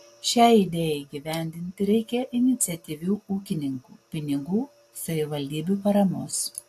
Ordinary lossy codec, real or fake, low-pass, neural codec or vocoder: AAC, 64 kbps; real; 14.4 kHz; none